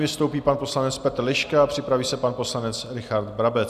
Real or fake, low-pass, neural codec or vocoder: real; 14.4 kHz; none